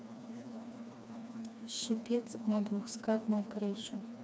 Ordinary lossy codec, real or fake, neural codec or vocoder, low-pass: none; fake; codec, 16 kHz, 2 kbps, FreqCodec, smaller model; none